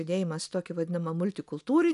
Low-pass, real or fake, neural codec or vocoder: 10.8 kHz; real; none